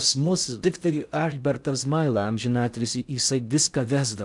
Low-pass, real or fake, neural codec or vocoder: 10.8 kHz; fake; codec, 16 kHz in and 24 kHz out, 0.6 kbps, FocalCodec, streaming, 4096 codes